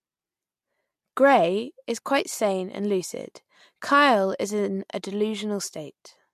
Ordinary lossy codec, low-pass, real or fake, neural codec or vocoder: MP3, 64 kbps; 14.4 kHz; real; none